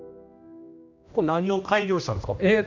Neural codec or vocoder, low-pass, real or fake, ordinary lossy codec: codec, 16 kHz, 1 kbps, X-Codec, HuBERT features, trained on general audio; 7.2 kHz; fake; none